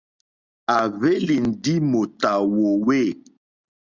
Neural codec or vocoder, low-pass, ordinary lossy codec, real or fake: none; 7.2 kHz; Opus, 64 kbps; real